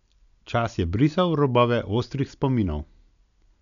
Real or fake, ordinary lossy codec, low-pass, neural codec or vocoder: real; none; 7.2 kHz; none